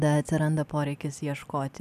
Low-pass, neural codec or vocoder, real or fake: 14.4 kHz; none; real